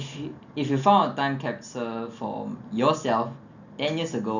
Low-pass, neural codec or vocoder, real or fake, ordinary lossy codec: 7.2 kHz; none; real; none